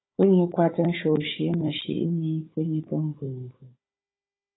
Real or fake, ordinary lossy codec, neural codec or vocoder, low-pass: fake; AAC, 16 kbps; codec, 16 kHz, 16 kbps, FunCodec, trained on Chinese and English, 50 frames a second; 7.2 kHz